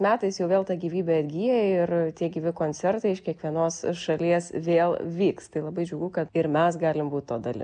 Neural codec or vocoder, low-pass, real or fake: none; 9.9 kHz; real